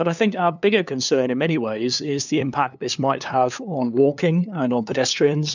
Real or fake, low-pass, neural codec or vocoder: fake; 7.2 kHz; codec, 16 kHz, 2 kbps, FunCodec, trained on LibriTTS, 25 frames a second